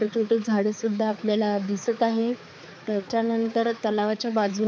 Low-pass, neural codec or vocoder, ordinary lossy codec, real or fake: none; codec, 16 kHz, 4 kbps, X-Codec, HuBERT features, trained on balanced general audio; none; fake